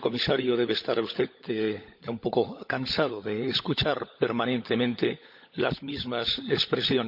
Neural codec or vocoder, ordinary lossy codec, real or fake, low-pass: codec, 16 kHz, 16 kbps, FunCodec, trained on LibriTTS, 50 frames a second; none; fake; 5.4 kHz